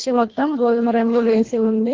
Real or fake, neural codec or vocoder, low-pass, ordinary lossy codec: fake; codec, 24 kHz, 1.5 kbps, HILCodec; 7.2 kHz; Opus, 16 kbps